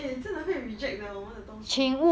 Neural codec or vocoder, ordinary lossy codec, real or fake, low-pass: none; none; real; none